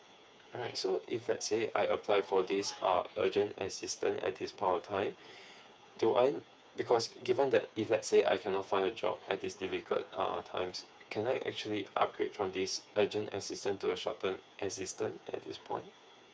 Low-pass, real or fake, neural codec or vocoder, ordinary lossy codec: none; fake; codec, 16 kHz, 4 kbps, FreqCodec, smaller model; none